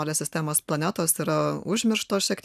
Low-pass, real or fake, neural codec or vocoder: 14.4 kHz; real; none